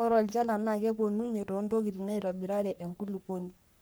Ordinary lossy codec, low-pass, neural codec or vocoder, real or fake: none; none; codec, 44.1 kHz, 3.4 kbps, Pupu-Codec; fake